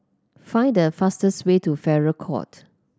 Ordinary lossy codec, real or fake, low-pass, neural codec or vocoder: none; real; none; none